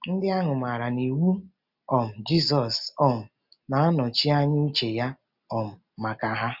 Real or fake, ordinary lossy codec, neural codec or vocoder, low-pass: real; none; none; 5.4 kHz